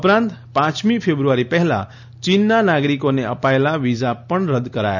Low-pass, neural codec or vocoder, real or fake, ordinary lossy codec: 7.2 kHz; none; real; none